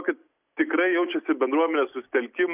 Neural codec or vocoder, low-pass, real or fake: none; 3.6 kHz; real